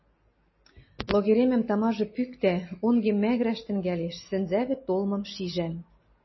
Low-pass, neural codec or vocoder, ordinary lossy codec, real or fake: 7.2 kHz; none; MP3, 24 kbps; real